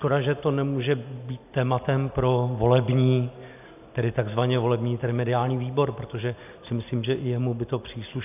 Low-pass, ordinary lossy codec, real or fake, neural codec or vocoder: 3.6 kHz; AAC, 32 kbps; real; none